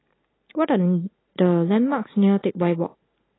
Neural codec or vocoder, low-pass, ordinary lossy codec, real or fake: none; 7.2 kHz; AAC, 16 kbps; real